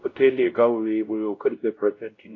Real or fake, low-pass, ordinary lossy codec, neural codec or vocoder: fake; 7.2 kHz; AAC, 32 kbps; codec, 16 kHz, 0.5 kbps, X-Codec, WavLM features, trained on Multilingual LibriSpeech